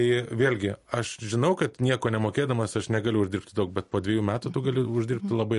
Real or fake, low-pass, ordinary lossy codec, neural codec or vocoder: real; 14.4 kHz; MP3, 48 kbps; none